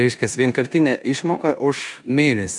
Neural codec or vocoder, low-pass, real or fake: codec, 16 kHz in and 24 kHz out, 0.9 kbps, LongCat-Audio-Codec, four codebook decoder; 10.8 kHz; fake